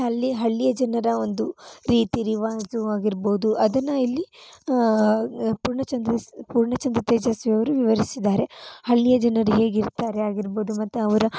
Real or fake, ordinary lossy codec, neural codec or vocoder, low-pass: real; none; none; none